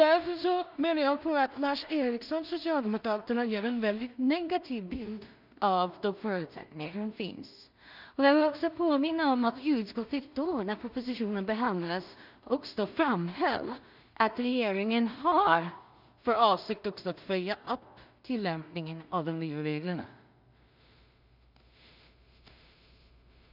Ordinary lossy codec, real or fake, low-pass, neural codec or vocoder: none; fake; 5.4 kHz; codec, 16 kHz in and 24 kHz out, 0.4 kbps, LongCat-Audio-Codec, two codebook decoder